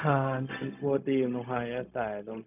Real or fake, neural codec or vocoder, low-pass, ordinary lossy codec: fake; codec, 16 kHz, 0.4 kbps, LongCat-Audio-Codec; 3.6 kHz; none